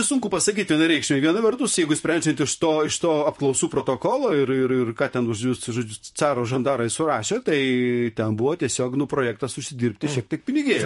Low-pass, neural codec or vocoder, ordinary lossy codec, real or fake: 14.4 kHz; vocoder, 44.1 kHz, 128 mel bands, Pupu-Vocoder; MP3, 48 kbps; fake